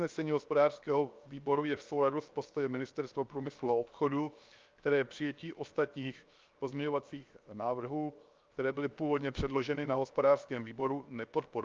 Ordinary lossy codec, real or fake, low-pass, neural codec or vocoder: Opus, 24 kbps; fake; 7.2 kHz; codec, 16 kHz, 0.7 kbps, FocalCodec